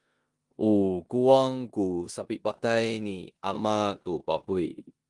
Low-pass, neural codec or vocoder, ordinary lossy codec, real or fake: 10.8 kHz; codec, 16 kHz in and 24 kHz out, 0.9 kbps, LongCat-Audio-Codec, four codebook decoder; Opus, 24 kbps; fake